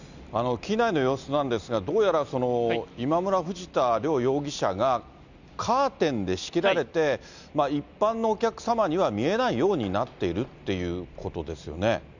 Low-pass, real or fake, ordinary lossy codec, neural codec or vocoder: 7.2 kHz; real; none; none